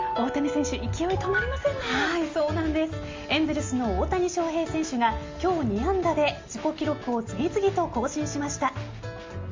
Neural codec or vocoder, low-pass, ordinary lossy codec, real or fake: none; 7.2 kHz; Opus, 32 kbps; real